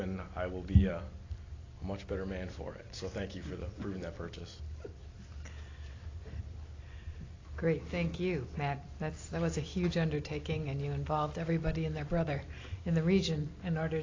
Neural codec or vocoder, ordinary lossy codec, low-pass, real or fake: none; AAC, 32 kbps; 7.2 kHz; real